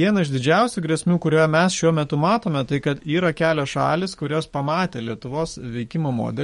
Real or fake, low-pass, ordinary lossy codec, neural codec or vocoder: fake; 19.8 kHz; MP3, 48 kbps; codec, 44.1 kHz, 7.8 kbps, DAC